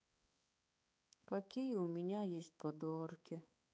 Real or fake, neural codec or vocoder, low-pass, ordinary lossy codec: fake; codec, 16 kHz, 4 kbps, X-Codec, HuBERT features, trained on balanced general audio; none; none